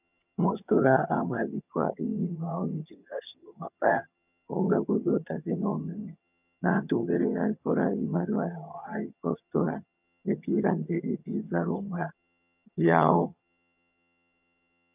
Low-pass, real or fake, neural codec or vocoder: 3.6 kHz; fake; vocoder, 22.05 kHz, 80 mel bands, HiFi-GAN